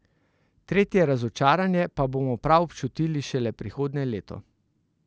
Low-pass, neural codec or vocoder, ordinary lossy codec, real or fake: none; none; none; real